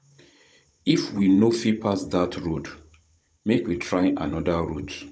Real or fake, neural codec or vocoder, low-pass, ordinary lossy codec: fake; codec, 16 kHz, 16 kbps, FunCodec, trained on Chinese and English, 50 frames a second; none; none